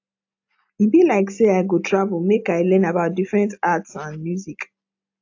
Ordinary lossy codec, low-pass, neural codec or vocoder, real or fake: AAC, 48 kbps; 7.2 kHz; none; real